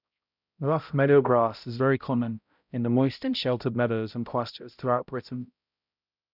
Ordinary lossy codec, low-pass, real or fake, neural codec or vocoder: none; 5.4 kHz; fake; codec, 16 kHz, 0.5 kbps, X-Codec, HuBERT features, trained on balanced general audio